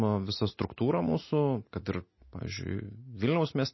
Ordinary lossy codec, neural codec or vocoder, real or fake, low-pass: MP3, 24 kbps; none; real; 7.2 kHz